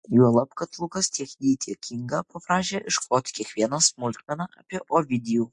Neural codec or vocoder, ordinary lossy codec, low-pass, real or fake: none; MP3, 48 kbps; 10.8 kHz; real